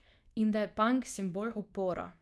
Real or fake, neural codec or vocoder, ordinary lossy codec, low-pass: fake; codec, 24 kHz, 0.9 kbps, WavTokenizer, medium speech release version 2; none; none